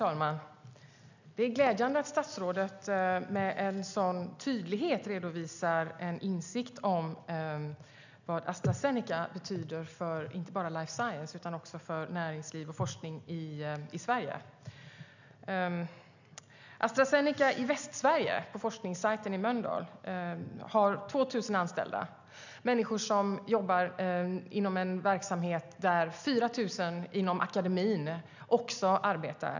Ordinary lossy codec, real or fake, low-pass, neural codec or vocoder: none; real; 7.2 kHz; none